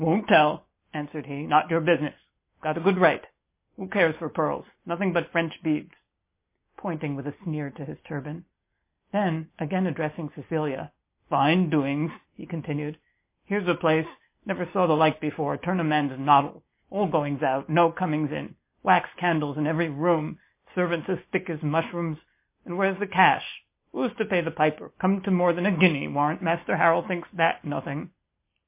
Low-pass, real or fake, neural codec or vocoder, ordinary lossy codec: 3.6 kHz; real; none; MP3, 24 kbps